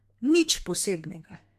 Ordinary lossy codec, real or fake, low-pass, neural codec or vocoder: AAC, 96 kbps; fake; 14.4 kHz; codec, 32 kHz, 1.9 kbps, SNAC